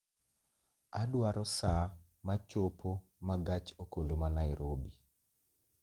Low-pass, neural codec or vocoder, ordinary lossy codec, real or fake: 19.8 kHz; codec, 44.1 kHz, 7.8 kbps, DAC; Opus, 32 kbps; fake